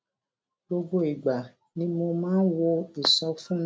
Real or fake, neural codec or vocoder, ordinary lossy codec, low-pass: real; none; none; none